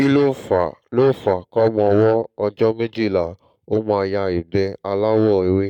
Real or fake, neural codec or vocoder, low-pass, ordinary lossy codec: fake; codec, 44.1 kHz, 7.8 kbps, Pupu-Codec; 19.8 kHz; none